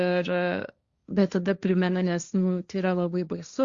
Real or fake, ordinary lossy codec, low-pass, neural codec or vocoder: fake; Opus, 64 kbps; 7.2 kHz; codec, 16 kHz, 1.1 kbps, Voila-Tokenizer